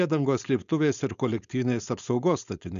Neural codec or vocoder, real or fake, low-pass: codec, 16 kHz, 4.8 kbps, FACodec; fake; 7.2 kHz